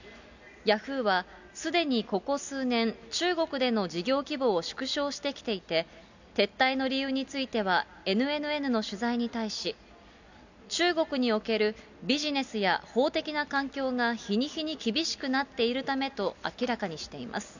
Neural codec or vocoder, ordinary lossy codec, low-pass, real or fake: none; none; 7.2 kHz; real